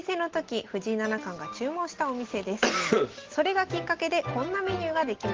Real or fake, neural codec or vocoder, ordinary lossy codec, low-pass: real; none; Opus, 16 kbps; 7.2 kHz